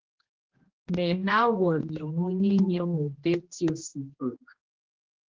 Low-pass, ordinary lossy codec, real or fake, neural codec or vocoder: 7.2 kHz; Opus, 16 kbps; fake; codec, 16 kHz, 1 kbps, X-Codec, HuBERT features, trained on general audio